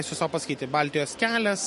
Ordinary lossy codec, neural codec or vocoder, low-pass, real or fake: MP3, 48 kbps; none; 14.4 kHz; real